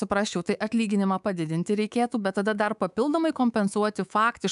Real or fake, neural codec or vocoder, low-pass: fake; codec, 24 kHz, 3.1 kbps, DualCodec; 10.8 kHz